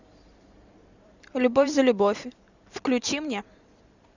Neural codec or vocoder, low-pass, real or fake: vocoder, 44.1 kHz, 128 mel bands every 512 samples, BigVGAN v2; 7.2 kHz; fake